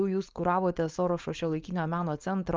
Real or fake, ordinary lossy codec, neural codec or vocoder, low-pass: fake; Opus, 24 kbps; codec, 16 kHz, 4.8 kbps, FACodec; 7.2 kHz